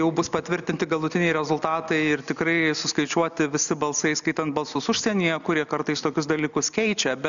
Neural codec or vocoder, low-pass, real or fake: none; 7.2 kHz; real